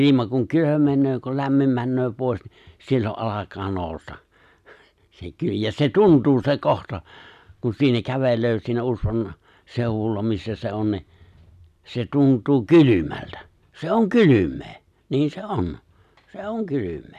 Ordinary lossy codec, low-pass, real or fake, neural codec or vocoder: none; 14.4 kHz; real; none